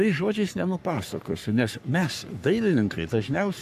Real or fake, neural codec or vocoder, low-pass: fake; codec, 44.1 kHz, 3.4 kbps, Pupu-Codec; 14.4 kHz